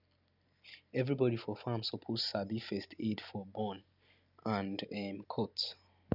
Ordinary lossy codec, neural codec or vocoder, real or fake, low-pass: none; none; real; 5.4 kHz